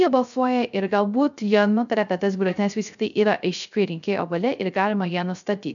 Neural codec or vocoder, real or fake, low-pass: codec, 16 kHz, 0.3 kbps, FocalCodec; fake; 7.2 kHz